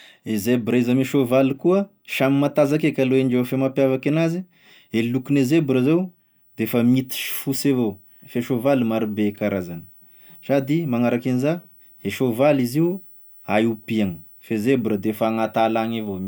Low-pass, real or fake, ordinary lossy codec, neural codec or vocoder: none; real; none; none